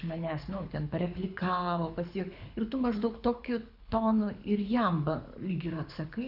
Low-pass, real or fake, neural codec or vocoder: 5.4 kHz; fake; codec, 24 kHz, 6 kbps, HILCodec